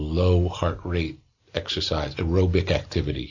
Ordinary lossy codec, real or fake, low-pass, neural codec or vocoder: AAC, 48 kbps; real; 7.2 kHz; none